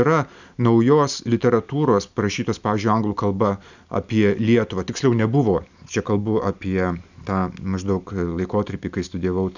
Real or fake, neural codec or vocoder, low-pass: real; none; 7.2 kHz